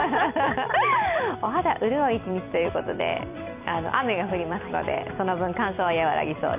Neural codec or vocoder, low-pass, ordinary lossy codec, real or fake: vocoder, 44.1 kHz, 128 mel bands every 256 samples, BigVGAN v2; 3.6 kHz; none; fake